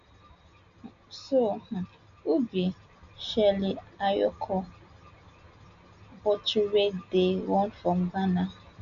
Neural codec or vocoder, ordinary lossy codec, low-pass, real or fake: none; MP3, 64 kbps; 7.2 kHz; real